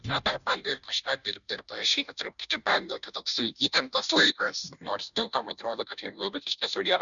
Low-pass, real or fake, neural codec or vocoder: 7.2 kHz; fake; codec, 16 kHz, 0.5 kbps, FunCodec, trained on Chinese and English, 25 frames a second